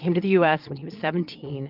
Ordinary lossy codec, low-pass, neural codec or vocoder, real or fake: Opus, 32 kbps; 5.4 kHz; vocoder, 22.05 kHz, 80 mel bands, Vocos; fake